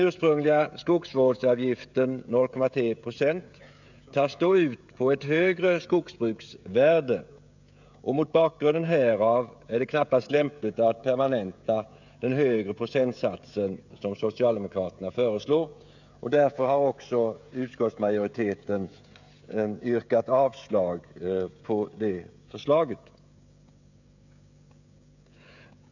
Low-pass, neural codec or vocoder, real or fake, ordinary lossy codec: 7.2 kHz; codec, 16 kHz, 16 kbps, FreqCodec, smaller model; fake; none